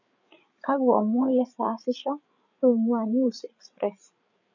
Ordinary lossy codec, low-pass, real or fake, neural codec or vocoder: none; 7.2 kHz; fake; codec, 16 kHz, 8 kbps, FreqCodec, larger model